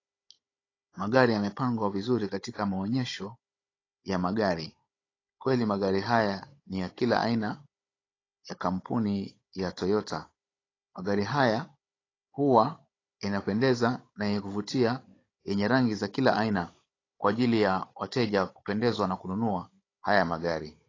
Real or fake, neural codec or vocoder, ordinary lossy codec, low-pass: fake; codec, 16 kHz, 16 kbps, FunCodec, trained on Chinese and English, 50 frames a second; AAC, 32 kbps; 7.2 kHz